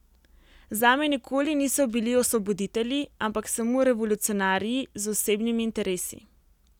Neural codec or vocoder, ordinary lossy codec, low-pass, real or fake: none; none; 19.8 kHz; real